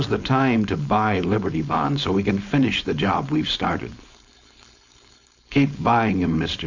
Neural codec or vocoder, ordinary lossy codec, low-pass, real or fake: codec, 16 kHz, 4.8 kbps, FACodec; MP3, 64 kbps; 7.2 kHz; fake